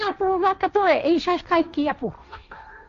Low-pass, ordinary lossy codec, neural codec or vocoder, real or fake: 7.2 kHz; AAC, 48 kbps; codec, 16 kHz, 1.1 kbps, Voila-Tokenizer; fake